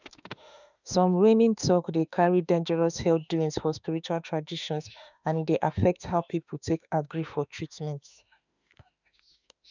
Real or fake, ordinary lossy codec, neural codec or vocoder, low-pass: fake; none; autoencoder, 48 kHz, 32 numbers a frame, DAC-VAE, trained on Japanese speech; 7.2 kHz